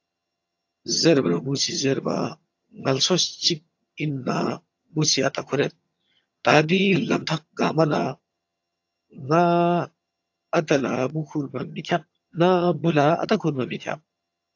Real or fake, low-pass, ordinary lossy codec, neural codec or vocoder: fake; 7.2 kHz; AAC, 48 kbps; vocoder, 22.05 kHz, 80 mel bands, HiFi-GAN